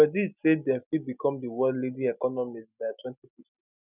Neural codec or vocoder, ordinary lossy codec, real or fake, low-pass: none; none; real; 3.6 kHz